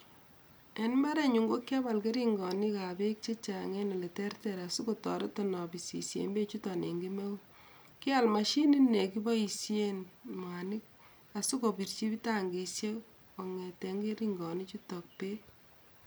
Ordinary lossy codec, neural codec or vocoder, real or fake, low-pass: none; none; real; none